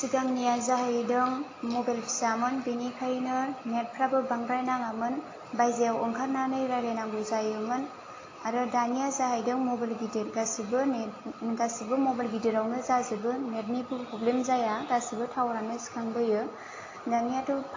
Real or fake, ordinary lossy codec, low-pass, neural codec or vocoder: real; AAC, 32 kbps; 7.2 kHz; none